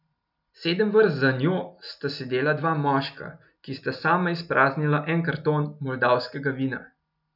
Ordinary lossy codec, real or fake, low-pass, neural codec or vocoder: none; real; 5.4 kHz; none